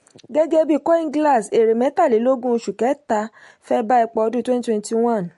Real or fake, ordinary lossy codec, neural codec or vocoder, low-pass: real; MP3, 48 kbps; none; 14.4 kHz